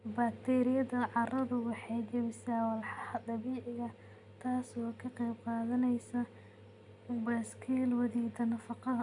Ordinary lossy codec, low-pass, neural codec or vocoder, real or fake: none; 10.8 kHz; none; real